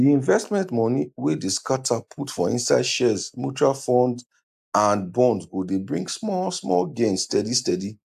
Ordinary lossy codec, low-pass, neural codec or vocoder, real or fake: AAC, 96 kbps; 14.4 kHz; none; real